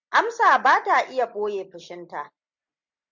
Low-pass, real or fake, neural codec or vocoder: 7.2 kHz; real; none